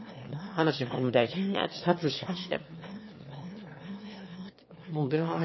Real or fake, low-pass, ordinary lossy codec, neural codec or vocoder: fake; 7.2 kHz; MP3, 24 kbps; autoencoder, 22.05 kHz, a latent of 192 numbers a frame, VITS, trained on one speaker